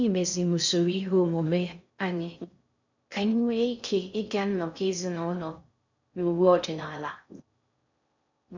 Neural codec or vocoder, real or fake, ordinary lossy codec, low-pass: codec, 16 kHz in and 24 kHz out, 0.6 kbps, FocalCodec, streaming, 2048 codes; fake; none; 7.2 kHz